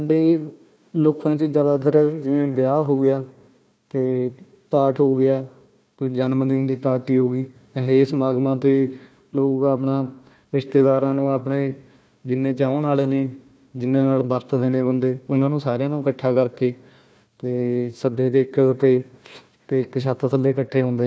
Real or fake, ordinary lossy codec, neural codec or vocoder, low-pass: fake; none; codec, 16 kHz, 1 kbps, FunCodec, trained on Chinese and English, 50 frames a second; none